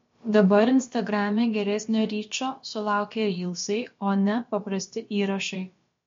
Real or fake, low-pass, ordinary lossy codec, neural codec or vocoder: fake; 7.2 kHz; MP3, 48 kbps; codec, 16 kHz, about 1 kbps, DyCAST, with the encoder's durations